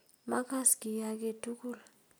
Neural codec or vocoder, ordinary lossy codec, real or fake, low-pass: none; none; real; none